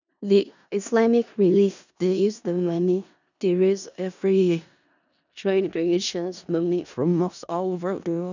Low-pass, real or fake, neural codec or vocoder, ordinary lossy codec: 7.2 kHz; fake; codec, 16 kHz in and 24 kHz out, 0.4 kbps, LongCat-Audio-Codec, four codebook decoder; none